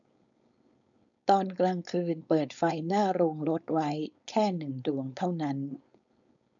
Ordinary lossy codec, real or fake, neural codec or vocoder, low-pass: none; fake; codec, 16 kHz, 4.8 kbps, FACodec; 7.2 kHz